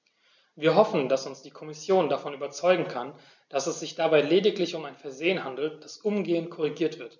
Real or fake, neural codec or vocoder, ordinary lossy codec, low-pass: real; none; none; 7.2 kHz